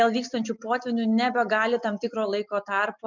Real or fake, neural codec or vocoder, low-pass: real; none; 7.2 kHz